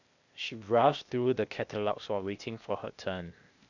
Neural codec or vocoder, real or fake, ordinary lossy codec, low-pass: codec, 16 kHz, 0.8 kbps, ZipCodec; fake; none; 7.2 kHz